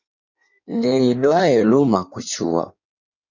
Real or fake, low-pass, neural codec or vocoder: fake; 7.2 kHz; codec, 16 kHz in and 24 kHz out, 1.1 kbps, FireRedTTS-2 codec